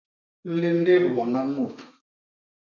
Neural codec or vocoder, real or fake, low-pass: codec, 32 kHz, 1.9 kbps, SNAC; fake; 7.2 kHz